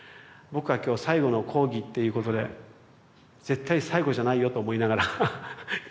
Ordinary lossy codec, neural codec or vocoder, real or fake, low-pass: none; none; real; none